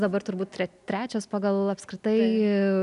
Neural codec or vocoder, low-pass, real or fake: none; 10.8 kHz; real